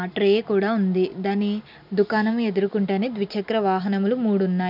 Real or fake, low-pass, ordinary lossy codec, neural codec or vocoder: real; 5.4 kHz; none; none